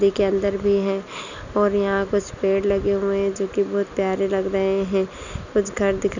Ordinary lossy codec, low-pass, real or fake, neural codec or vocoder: none; 7.2 kHz; real; none